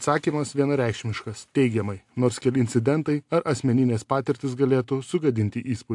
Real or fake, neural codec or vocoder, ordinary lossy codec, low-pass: real; none; AAC, 64 kbps; 10.8 kHz